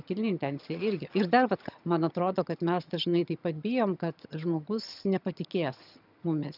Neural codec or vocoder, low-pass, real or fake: vocoder, 22.05 kHz, 80 mel bands, HiFi-GAN; 5.4 kHz; fake